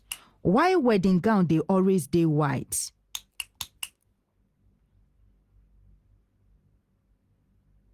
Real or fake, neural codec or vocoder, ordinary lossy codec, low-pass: real; none; Opus, 24 kbps; 14.4 kHz